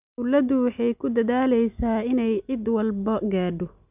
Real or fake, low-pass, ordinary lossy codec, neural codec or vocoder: real; 3.6 kHz; none; none